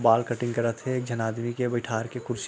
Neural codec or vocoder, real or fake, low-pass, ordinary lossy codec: none; real; none; none